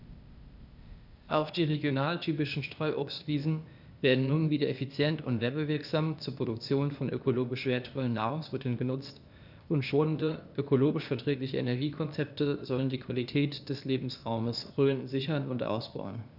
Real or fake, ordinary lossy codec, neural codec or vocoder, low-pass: fake; none; codec, 16 kHz, 0.8 kbps, ZipCodec; 5.4 kHz